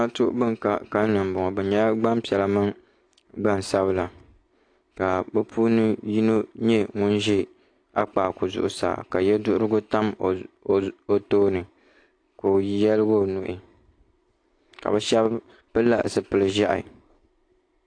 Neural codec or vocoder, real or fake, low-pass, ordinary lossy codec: none; real; 9.9 kHz; AAC, 48 kbps